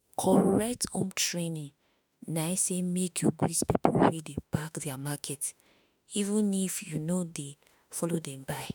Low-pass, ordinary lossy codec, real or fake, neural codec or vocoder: none; none; fake; autoencoder, 48 kHz, 32 numbers a frame, DAC-VAE, trained on Japanese speech